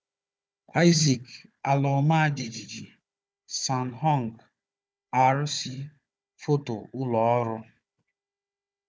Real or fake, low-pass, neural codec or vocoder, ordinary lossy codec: fake; none; codec, 16 kHz, 4 kbps, FunCodec, trained on Chinese and English, 50 frames a second; none